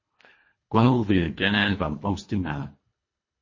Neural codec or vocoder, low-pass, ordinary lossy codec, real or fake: codec, 24 kHz, 1.5 kbps, HILCodec; 7.2 kHz; MP3, 32 kbps; fake